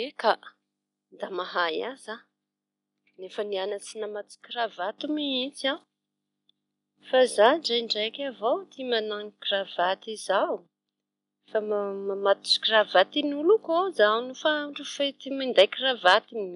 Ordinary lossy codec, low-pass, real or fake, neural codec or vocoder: none; 10.8 kHz; real; none